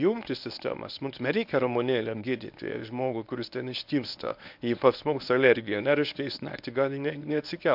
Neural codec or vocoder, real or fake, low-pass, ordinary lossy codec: codec, 24 kHz, 0.9 kbps, WavTokenizer, medium speech release version 1; fake; 5.4 kHz; MP3, 48 kbps